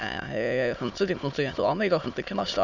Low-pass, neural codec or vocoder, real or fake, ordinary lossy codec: 7.2 kHz; autoencoder, 22.05 kHz, a latent of 192 numbers a frame, VITS, trained on many speakers; fake; none